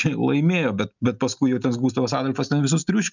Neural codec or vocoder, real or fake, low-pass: none; real; 7.2 kHz